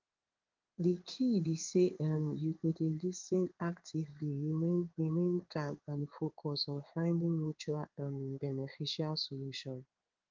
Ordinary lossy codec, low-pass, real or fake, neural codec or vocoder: Opus, 24 kbps; 7.2 kHz; fake; codec, 16 kHz in and 24 kHz out, 1 kbps, XY-Tokenizer